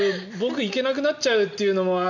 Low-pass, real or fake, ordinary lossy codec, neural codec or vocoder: 7.2 kHz; real; none; none